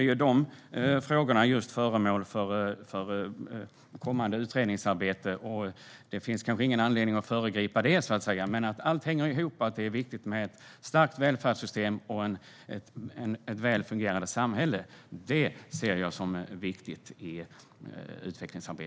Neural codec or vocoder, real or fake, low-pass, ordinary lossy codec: none; real; none; none